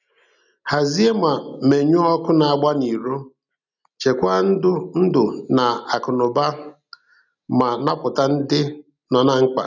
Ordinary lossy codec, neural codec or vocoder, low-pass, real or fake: none; none; 7.2 kHz; real